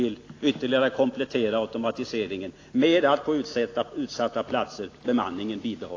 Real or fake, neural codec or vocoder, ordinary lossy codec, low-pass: real; none; AAC, 32 kbps; 7.2 kHz